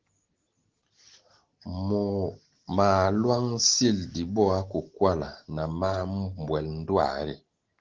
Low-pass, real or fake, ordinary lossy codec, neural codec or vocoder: 7.2 kHz; real; Opus, 16 kbps; none